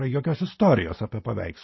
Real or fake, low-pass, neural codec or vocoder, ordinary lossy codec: real; 7.2 kHz; none; MP3, 24 kbps